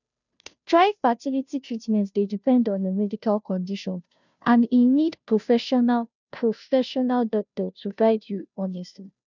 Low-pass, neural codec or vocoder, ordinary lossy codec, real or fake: 7.2 kHz; codec, 16 kHz, 0.5 kbps, FunCodec, trained on Chinese and English, 25 frames a second; none; fake